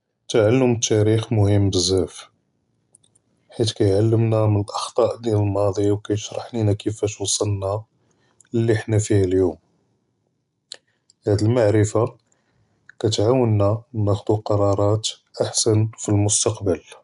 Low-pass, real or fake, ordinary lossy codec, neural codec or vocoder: 10.8 kHz; real; none; none